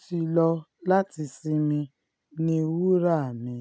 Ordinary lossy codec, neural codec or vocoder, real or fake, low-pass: none; none; real; none